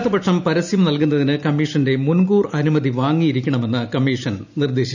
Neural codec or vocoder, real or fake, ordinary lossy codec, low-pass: none; real; none; 7.2 kHz